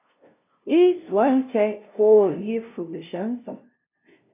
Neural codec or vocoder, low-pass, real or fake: codec, 16 kHz, 0.5 kbps, FunCodec, trained on LibriTTS, 25 frames a second; 3.6 kHz; fake